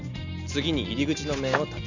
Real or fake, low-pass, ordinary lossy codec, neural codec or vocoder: real; 7.2 kHz; none; none